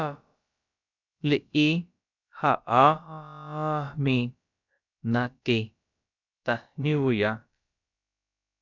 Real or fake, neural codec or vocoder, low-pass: fake; codec, 16 kHz, about 1 kbps, DyCAST, with the encoder's durations; 7.2 kHz